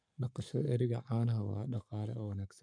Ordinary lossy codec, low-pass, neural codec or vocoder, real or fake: none; 9.9 kHz; codec, 44.1 kHz, 7.8 kbps, Pupu-Codec; fake